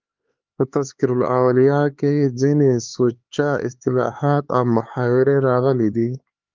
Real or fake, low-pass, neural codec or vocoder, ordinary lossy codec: fake; 7.2 kHz; codec, 16 kHz, 4 kbps, X-Codec, HuBERT features, trained on LibriSpeech; Opus, 32 kbps